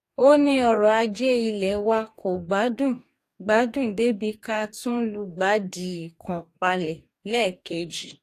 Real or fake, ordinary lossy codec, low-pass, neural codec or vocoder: fake; AAC, 64 kbps; 14.4 kHz; codec, 44.1 kHz, 2.6 kbps, DAC